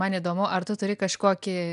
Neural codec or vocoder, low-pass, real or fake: none; 10.8 kHz; real